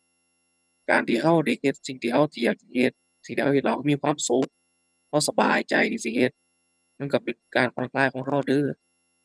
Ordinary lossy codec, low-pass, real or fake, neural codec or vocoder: none; none; fake; vocoder, 22.05 kHz, 80 mel bands, HiFi-GAN